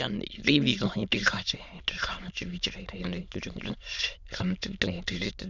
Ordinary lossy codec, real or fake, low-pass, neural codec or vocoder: Opus, 64 kbps; fake; 7.2 kHz; autoencoder, 22.05 kHz, a latent of 192 numbers a frame, VITS, trained on many speakers